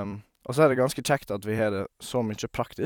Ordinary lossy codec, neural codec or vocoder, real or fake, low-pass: none; vocoder, 44.1 kHz, 128 mel bands every 256 samples, BigVGAN v2; fake; 19.8 kHz